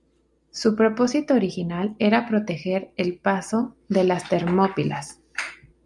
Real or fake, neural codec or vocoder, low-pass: real; none; 10.8 kHz